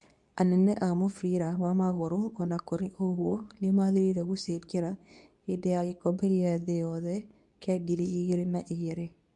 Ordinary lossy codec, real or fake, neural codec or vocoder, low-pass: none; fake; codec, 24 kHz, 0.9 kbps, WavTokenizer, medium speech release version 2; 10.8 kHz